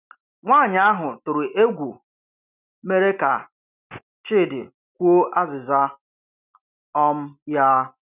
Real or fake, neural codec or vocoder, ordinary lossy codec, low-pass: real; none; none; 3.6 kHz